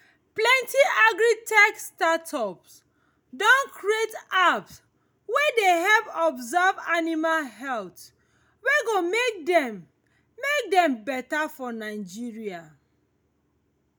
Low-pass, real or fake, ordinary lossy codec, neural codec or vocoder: none; real; none; none